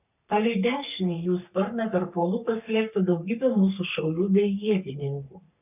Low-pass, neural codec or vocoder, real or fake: 3.6 kHz; codec, 44.1 kHz, 3.4 kbps, Pupu-Codec; fake